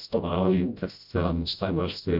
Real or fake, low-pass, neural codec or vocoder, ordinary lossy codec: fake; 5.4 kHz; codec, 16 kHz, 0.5 kbps, FreqCodec, smaller model; AAC, 48 kbps